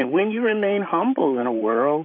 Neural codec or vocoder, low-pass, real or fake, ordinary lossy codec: vocoder, 44.1 kHz, 128 mel bands, Pupu-Vocoder; 5.4 kHz; fake; MP3, 32 kbps